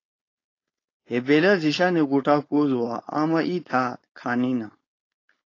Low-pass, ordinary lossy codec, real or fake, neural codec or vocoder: 7.2 kHz; AAC, 32 kbps; fake; codec, 16 kHz, 4.8 kbps, FACodec